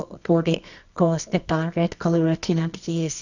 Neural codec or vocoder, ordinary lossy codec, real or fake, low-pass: codec, 24 kHz, 0.9 kbps, WavTokenizer, medium music audio release; none; fake; 7.2 kHz